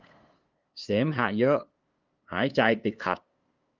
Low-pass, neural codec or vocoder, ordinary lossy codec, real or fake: 7.2 kHz; codec, 16 kHz, 2 kbps, FunCodec, trained on LibriTTS, 25 frames a second; Opus, 32 kbps; fake